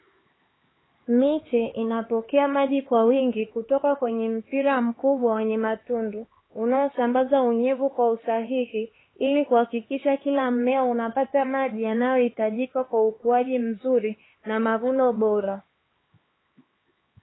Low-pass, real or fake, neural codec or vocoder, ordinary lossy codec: 7.2 kHz; fake; codec, 16 kHz, 4 kbps, X-Codec, HuBERT features, trained on LibriSpeech; AAC, 16 kbps